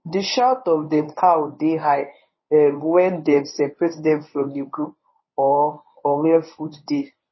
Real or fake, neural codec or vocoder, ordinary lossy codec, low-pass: fake; codec, 24 kHz, 0.9 kbps, WavTokenizer, medium speech release version 1; MP3, 24 kbps; 7.2 kHz